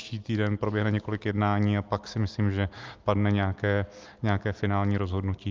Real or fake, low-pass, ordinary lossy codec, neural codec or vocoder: real; 7.2 kHz; Opus, 32 kbps; none